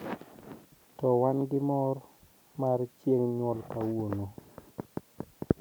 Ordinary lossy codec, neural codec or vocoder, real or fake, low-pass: none; none; real; none